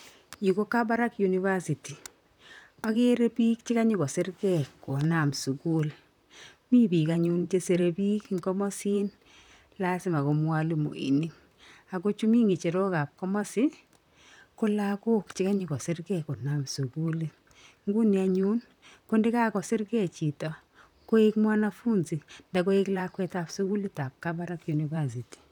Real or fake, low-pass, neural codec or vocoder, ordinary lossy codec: fake; 19.8 kHz; vocoder, 44.1 kHz, 128 mel bands, Pupu-Vocoder; none